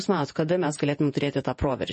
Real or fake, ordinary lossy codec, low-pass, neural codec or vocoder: fake; MP3, 32 kbps; 10.8 kHz; vocoder, 48 kHz, 128 mel bands, Vocos